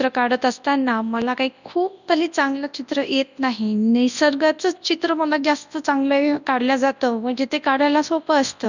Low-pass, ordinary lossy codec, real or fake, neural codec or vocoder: 7.2 kHz; none; fake; codec, 24 kHz, 0.9 kbps, WavTokenizer, large speech release